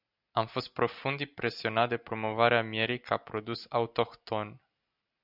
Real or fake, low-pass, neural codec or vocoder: real; 5.4 kHz; none